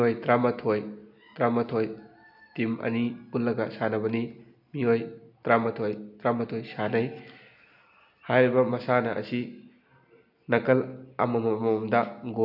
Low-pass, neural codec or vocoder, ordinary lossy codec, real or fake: 5.4 kHz; none; none; real